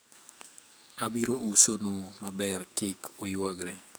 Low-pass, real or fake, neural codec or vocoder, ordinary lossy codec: none; fake; codec, 44.1 kHz, 2.6 kbps, SNAC; none